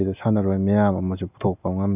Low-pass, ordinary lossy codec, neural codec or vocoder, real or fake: 3.6 kHz; none; none; real